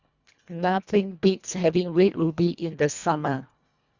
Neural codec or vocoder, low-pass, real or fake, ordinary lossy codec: codec, 24 kHz, 1.5 kbps, HILCodec; 7.2 kHz; fake; Opus, 64 kbps